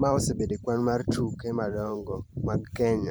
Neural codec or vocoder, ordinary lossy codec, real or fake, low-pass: vocoder, 44.1 kHz, 128 mel bands every 512 samples, BigVGAN v2; none; fake; none